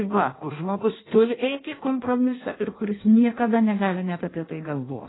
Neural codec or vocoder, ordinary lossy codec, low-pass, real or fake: codec, 16 kHz in and 24 kHz out, 0.6 kbps, FireRedTTS-2 codec; AAC, 16 kbps; 7.2 kHz; fake